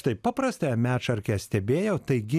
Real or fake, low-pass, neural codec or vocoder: real; 14.4 kHz; none